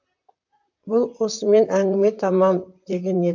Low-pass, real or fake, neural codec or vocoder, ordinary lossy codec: 7.2 kHz; fake; vocoder, 44.1 kHz, 128 mel bands, Pupu-Vocoder; AAC, 48 kbps